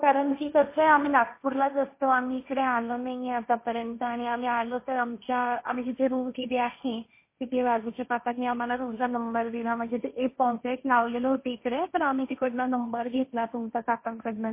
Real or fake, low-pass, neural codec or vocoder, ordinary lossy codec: fake; 3.6 kHz; codec, 16 kHz, 1.1 kbps, Voila-Tokenizer; MP3, 24 kbps